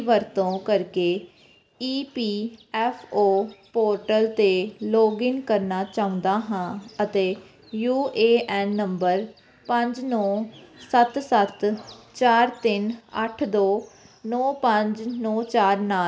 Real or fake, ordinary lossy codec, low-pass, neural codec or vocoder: real; none; none; none